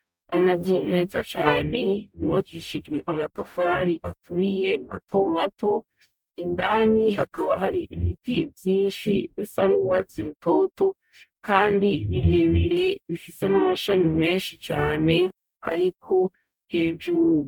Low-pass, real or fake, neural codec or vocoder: 19.8 kHz; fake; codec, 44.1 kHz, 0.9 kbps, DAC